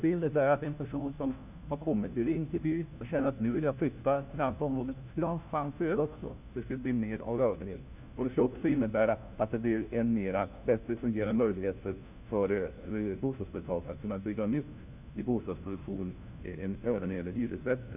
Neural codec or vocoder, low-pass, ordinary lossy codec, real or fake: codec, 16 kHz, 1 kbps, FunCodec, trained on LibriTTS, 50 frames a second; 3.6 kHz; none; fake